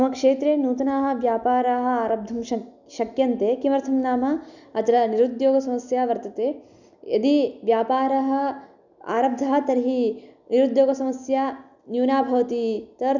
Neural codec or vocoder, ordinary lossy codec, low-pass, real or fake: none; none; 7.2 kHz; real